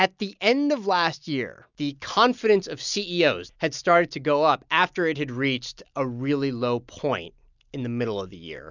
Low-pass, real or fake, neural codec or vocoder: 7.2 kHz; real; none